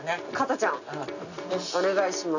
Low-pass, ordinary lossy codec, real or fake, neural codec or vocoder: 7.2 kHz; none; real; none